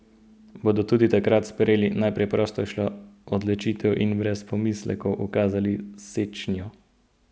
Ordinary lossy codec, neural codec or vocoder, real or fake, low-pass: none; none; real; none